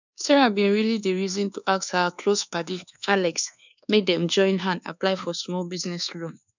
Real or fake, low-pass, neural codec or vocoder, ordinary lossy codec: fake; 7.2 kHz; codec, 24 kHz, 1.2 kbps, DualCodec; none